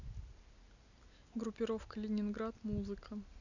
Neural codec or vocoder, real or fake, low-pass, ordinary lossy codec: none; real; 7.2 kHz; none